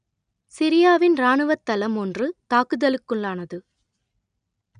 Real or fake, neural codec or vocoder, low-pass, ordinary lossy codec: real; none; 9.9 kHz; none